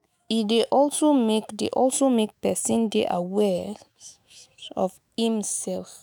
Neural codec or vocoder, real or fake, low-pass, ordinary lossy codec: autoencoder, 48 kHz, 128 numbers a frame, DAC-VAE, trained on Japanese speech; fake; none; none